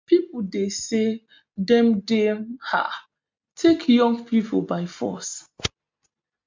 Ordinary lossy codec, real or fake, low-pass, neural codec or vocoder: MP3, 64 kbps; real; 7.2 kHz; none